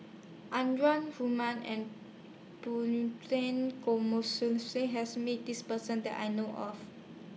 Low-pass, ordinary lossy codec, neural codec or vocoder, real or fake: none; none; none; real